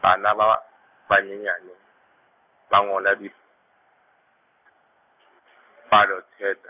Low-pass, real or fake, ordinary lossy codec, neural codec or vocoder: 3.6 kHz; real; none; none